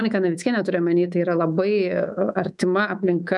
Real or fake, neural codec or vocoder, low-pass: fake; codec, 24 kHz, 3.1 kbps, DualCodec; 10.8 kHz